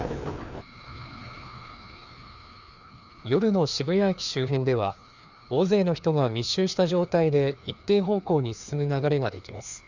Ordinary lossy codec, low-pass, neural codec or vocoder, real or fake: none; 7.2 kHz; codec, 16 kHz, 2 kbps, FreqCodec, larger model; fake